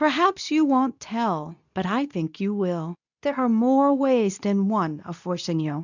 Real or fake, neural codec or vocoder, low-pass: fake; codec, 24 kHz, 0.9 kbps, WavTokenizer, medium speech release version 2; 7.2 kHz